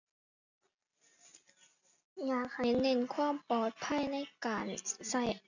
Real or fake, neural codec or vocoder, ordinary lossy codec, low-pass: real; none; none; 7.2 kHz